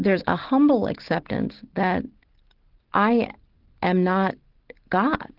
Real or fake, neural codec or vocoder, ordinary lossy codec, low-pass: real; none; Opus, 16 kbps; 5.4 kHz